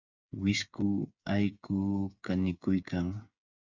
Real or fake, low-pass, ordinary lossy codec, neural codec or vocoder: fake; 7.2 kHz; Opus, 64 kbps; codec, 16 kHz, 8 kbps, FreqCodec, smaller model